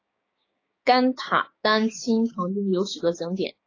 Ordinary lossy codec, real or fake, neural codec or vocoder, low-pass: AAC, 32 kbps; fake; codec, 16 kHz, 6 kbps, DAC; 7.2 kHz